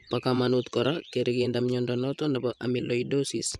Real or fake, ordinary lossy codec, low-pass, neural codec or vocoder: fake; none; none; vocoder, 24 kHz, 100 mel bands, Vocos